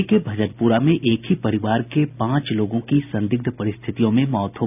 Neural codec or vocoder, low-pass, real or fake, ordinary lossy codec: none; 3.6 kHz; real; none